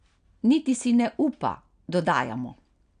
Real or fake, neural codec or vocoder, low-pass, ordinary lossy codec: real; none; 9.9 kHz; none